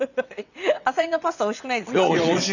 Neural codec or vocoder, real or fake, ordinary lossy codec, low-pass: vocoder, 22.05 kHz, 80 mel bands, WaveNeXt; fake; none; 7.2 kHz